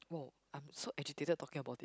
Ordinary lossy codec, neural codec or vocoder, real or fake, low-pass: none; none; real; none